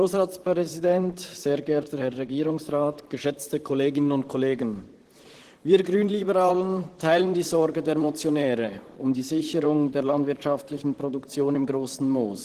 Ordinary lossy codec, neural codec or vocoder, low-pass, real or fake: Opus, 16 kbps; vocoder, 44.1 kHz, 128 mel bands, Pupu-Vocoder; 14.4 kHz; fake